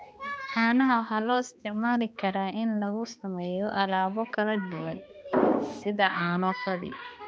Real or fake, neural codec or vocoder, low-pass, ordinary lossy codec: fake; codec, 16 kHz, 2 kbps, X-Codec, HuBERT features, trained on balanced general audio; none; none